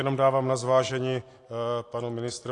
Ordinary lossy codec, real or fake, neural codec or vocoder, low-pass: AAC, 48 kbps; real; none; 10.8 kHz